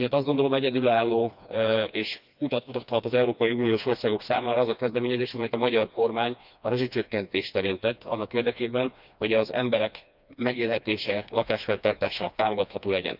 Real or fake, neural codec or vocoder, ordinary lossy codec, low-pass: fake; codec, 16 kHz, 2 kbps, FreqCodec, smaller model; none; 5.4 kHz